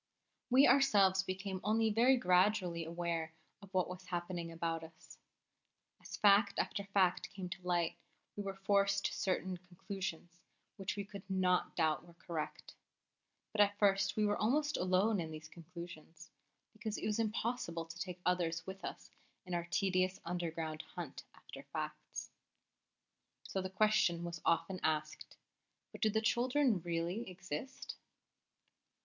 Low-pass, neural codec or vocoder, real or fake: 7.2 kHz; none; real